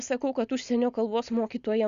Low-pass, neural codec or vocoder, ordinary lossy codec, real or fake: 7.2 kHz; none; Opus, 64 kbps; real